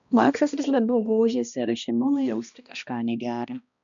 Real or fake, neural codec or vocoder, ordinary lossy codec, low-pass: fake; codec, 16 kHz, 1 kbps, X-Codec, HuBERT features, trained on balanced general audio; MP3, 96 kbps; 7.2 kHz